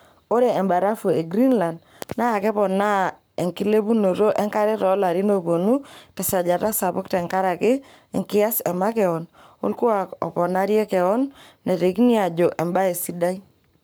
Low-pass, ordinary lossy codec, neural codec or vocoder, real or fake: none; none; codec, 44.1 kHz, 7.8 kbps, Pupu-Codec; fake